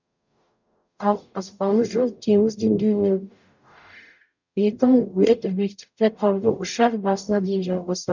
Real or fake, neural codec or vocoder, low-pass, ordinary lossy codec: fake; codec, 44.1 kHz, 0.9 kbps, DAC; 7.2 kHz; none